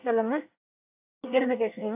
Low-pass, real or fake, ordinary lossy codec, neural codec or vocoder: 3.6 kHz; fake; AAC, 24 kbps; codec, 24 kHz, 1 kbps, SNAC